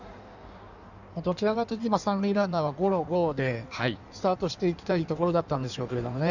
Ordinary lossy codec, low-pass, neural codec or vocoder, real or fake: none; 7.2 kHz; codec, 16 kHz in and 24 kHz out, 1.1 kbps, FireRedTTS-2 codec; fake